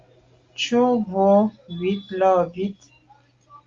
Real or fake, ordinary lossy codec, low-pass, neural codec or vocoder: real; Opus, 32 kbps; 7.2 kHz; none